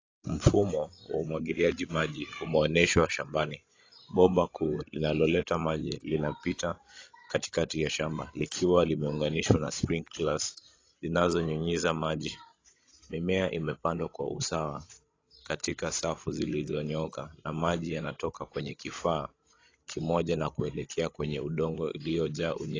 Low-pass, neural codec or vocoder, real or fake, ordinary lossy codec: 7.2 kHz; codec, 16 kHz, 16 kbps, FreqCodec, larger model; fake; AAC, 32 kbps